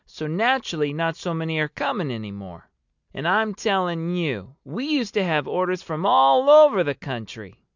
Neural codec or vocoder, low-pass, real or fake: none; 7.2 kHz; real